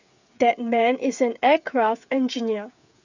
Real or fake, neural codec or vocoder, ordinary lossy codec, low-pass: fake; codec, 16 kHz, 8 kbps, FreqCodec, smaller model; none; 7.2 kHz